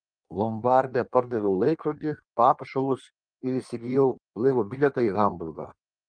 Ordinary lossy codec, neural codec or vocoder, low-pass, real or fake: Opus, 32 kbps; codec, 16 kHz in and 24 kHz out, 1.1 kbps, FireRedTTS-2 codec; 9.9 kHz; fake